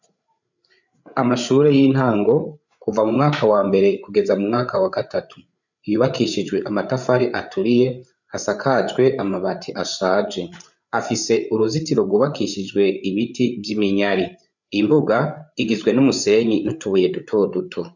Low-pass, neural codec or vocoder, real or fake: 7.2 kHz; codec, 16 kHz, 8 kbps, FreqCodec, larger model; fake